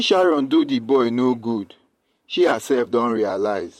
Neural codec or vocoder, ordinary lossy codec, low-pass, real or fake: vocoder, 44.1 kHz, 128 mel bands, Pupu-Vocoder; MP3, 64 kbps; 19.8 kHz; fake